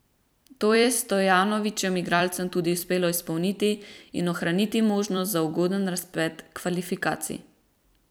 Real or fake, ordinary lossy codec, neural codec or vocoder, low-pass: fake; none; vocoder, 44.1 kHz, 128 mel bands every 256 samples, BigVGAN v2; none